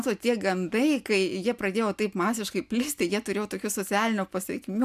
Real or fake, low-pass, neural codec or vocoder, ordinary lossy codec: real; 14.4 kHz; none; MP3, 96 kbps